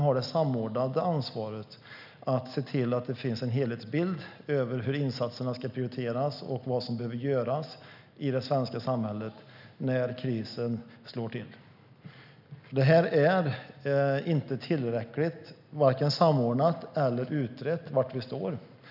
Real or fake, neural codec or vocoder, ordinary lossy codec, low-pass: real; none; none; 5.4 kHz